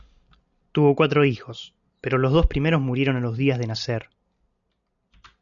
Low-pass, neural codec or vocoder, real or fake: 7.2 kHz; none; real